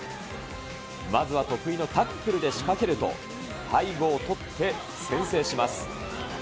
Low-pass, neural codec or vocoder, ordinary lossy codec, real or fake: none; none; none; real